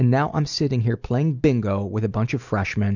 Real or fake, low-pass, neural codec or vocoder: real; 7.2 kHz; none